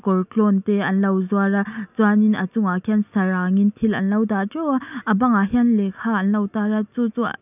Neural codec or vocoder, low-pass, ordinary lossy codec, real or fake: none; 3.6 kHz; none; real